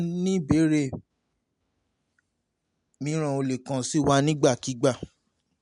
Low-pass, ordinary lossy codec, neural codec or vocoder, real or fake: 10.8 kHz; none; none; real